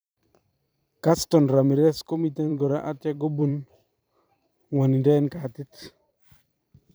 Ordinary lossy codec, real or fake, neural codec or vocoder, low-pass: none; fake; vocoder, 44.1 kHz, 128 mel bands every 512 samples, BigVGAN v2; none